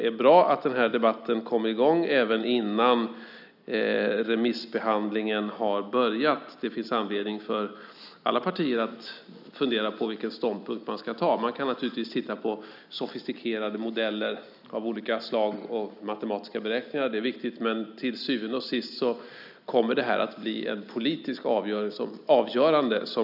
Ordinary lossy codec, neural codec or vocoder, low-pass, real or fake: none; none; 5.4 kHz; real